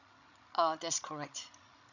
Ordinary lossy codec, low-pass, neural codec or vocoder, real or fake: none; 7.2 kHz; codec, 16 kHz, 16 kbps, FreqCodec, larger model; fake